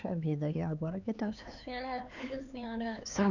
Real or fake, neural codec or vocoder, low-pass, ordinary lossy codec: fake; codec, 16 kHz, 4 kbps, X-Codec, HuBERT features, trained on LibriSpeech; 7.2 kHz; none